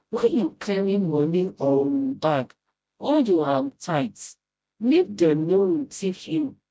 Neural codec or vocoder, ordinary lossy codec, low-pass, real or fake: codec, 16 kHz, 0.5 kbps, FreqCodec, smaller model; none; none; fake